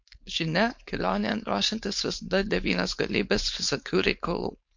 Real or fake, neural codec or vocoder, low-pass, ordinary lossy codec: fake; codec, 16 kHz, 4.8 kbps, FACodec; 7.2 kHz; MP3, 48 kbps